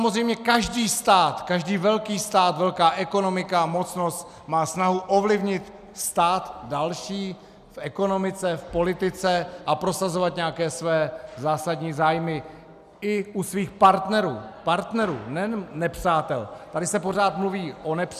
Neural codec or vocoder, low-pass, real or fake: none; 14.4 kHz; real